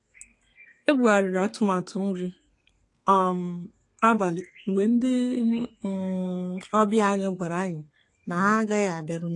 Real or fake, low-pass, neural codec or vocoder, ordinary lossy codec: fake; 10.8 kHz; codec, 32 kHz, 1.9 kbps, SNAC; AAC, 48 kbps